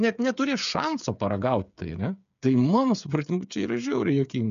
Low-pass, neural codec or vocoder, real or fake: 7.2 kHz; codec, 16 kHz, 8 kbps, FreqCodec, smaller model; fake